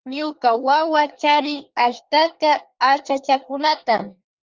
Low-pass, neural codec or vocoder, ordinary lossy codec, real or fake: 7.2 kHz; codec, 24 kHz, 1 kbps, SNAC; Opus, 32 kbps; fake